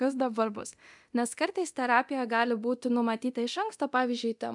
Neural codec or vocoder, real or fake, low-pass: codec, 24 kHz, 0.9 kbps, DualCodec; fake; 10.8 kHz